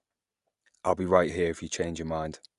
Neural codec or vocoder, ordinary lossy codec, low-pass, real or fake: none; Opus, 64 kbps; 10.8 kHz; real